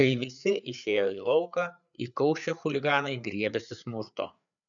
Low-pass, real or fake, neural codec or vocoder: 7.2 kHz; fake; codec, 16 kHz, 4 kbps, FreqCodec, larger model